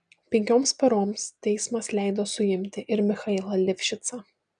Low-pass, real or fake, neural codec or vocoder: 9.9 kHz; real; none